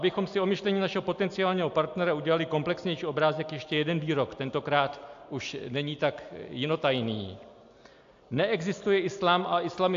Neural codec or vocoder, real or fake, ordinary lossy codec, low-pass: none; real; AAC, 64 kbps; 7.2 kHz